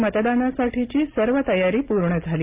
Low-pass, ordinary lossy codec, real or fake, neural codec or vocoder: 3.6 kHz; Opus, 24 kbps; real; none